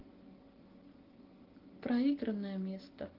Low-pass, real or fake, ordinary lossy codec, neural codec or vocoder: 5.4 kHz; real; Opus, 16 kbps; none